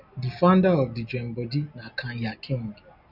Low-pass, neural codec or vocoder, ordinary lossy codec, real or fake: 5.4 kHz; none; none; real